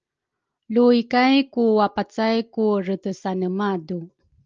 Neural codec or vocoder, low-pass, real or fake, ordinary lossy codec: none; 7.2 kHz; real; Opus, 24 kbps